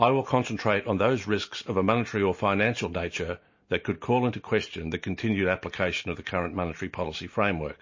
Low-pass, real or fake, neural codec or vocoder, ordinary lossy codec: 7.2 kHz; real; none; MP3, 32 kbps